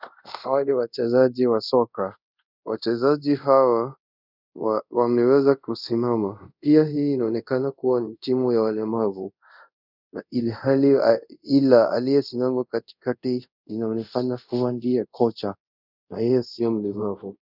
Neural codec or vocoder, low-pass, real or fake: codec, 24 kHz, 0.5 kbps, DualCodec; 5.4 kHz; fake